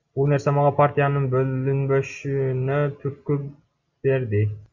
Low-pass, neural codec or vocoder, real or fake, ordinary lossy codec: 7.2 kHz; none; real; Opus, 64 kbps